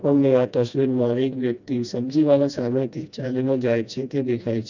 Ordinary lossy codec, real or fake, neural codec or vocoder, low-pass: none; fake; codec, 16 kHz, 1 kbps, FreqCodec, smaller model; 7.2 kHz